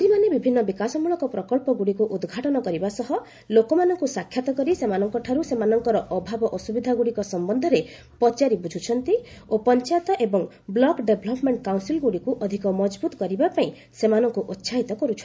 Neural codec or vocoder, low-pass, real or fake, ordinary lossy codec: none; none; real; none